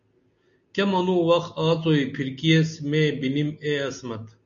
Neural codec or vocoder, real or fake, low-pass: none; real; 7.2 kHz